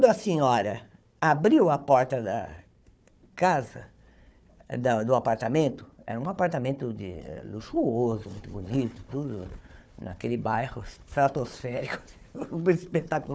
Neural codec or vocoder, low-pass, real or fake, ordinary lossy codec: codec, 16 kHz, 4 kbps, FunCodec, trained on Chinese and English, 50 frames a second; none; fake; none